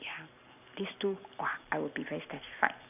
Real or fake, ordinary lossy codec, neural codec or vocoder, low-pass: real; none; none; 3.6 kHz